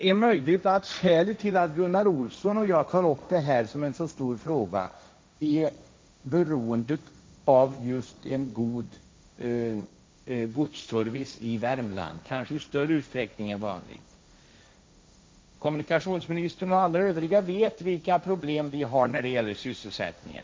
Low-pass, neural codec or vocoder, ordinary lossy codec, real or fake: none; codec, 16 kHz, 1.1 kbps, Voila-Tokenizer; none; fake